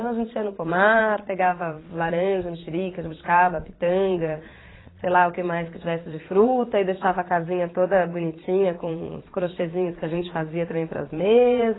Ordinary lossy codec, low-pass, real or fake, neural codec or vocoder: AAC, 16 kbps; 7.2 kHz; fake; vocoder, 22.05 kHz, 80 mel bands, Vocos